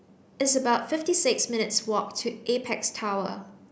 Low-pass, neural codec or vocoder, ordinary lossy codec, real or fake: none; none; none; real